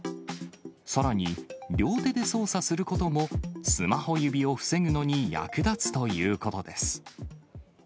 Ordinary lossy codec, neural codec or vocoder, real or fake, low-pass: none; none; real; none